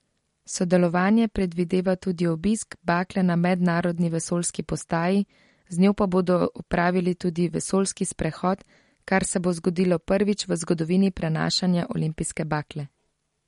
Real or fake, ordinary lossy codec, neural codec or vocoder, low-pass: real; MP3, 48 kbps; none; 19.8 kHz